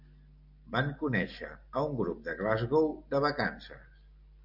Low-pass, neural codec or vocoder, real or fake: 5.4 kHz; none; real